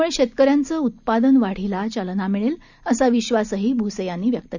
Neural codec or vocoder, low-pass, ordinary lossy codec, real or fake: none; 7.2 kHz; none; real